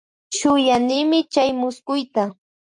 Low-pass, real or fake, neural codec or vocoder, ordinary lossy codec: 10.8 kHz; fake; vocoder, 44.1 kHz, 128 mel bands every 256 samples, BigVGAN v2; MP3, 64 kbps